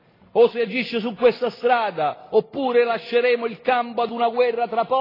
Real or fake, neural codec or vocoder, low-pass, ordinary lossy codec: real; none; 5.4 kHz; AAC, 24 kbps